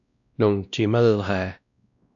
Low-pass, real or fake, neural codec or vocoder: 7.2 kHz; fake; codec, 16 kHz, 1 kbps, X-Codec, WavLM features, trained on Multilingual LibriSpeech